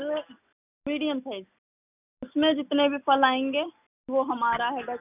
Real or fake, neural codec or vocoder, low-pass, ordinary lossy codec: real; none; 3.6 kHz; none